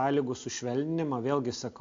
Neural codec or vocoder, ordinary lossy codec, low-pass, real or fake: none; AAC, 48 kbps; 7.2 kHz; real